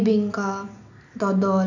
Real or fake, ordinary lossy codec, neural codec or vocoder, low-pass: real; none; none; 7.2 kHz